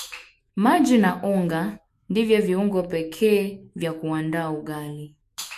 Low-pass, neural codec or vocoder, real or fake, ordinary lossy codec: 14.4 kHz; autoencoder, 48 kHz, 128 numbers a frame, DAC-VAE, trained on Japanese speech; fake; MP3, 64 kbps